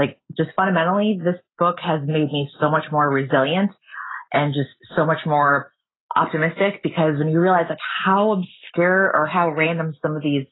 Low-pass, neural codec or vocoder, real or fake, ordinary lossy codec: 7.2 kHz; none; real; AAC, 16 kbps